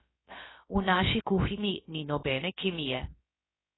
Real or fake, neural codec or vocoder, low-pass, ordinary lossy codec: fake; codec, 16 kHz, about 1 kbps, DyCAST, with the encoder's durations; 7.2 kHz; AAC, 16 kbps